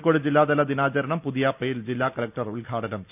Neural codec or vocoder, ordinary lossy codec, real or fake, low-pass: none; none; real; 3.6 kHz